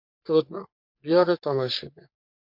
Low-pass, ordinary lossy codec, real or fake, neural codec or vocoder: 5.4 kHz; MP3, 48 kbps; fake; codec, 44.1 kHz, 3.4 kbps, Pupu-Codec